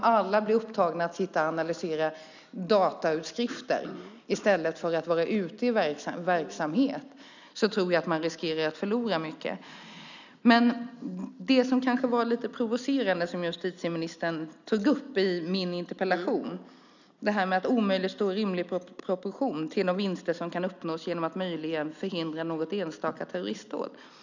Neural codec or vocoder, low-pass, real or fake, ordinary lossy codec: none; 7.2 kHz; real; none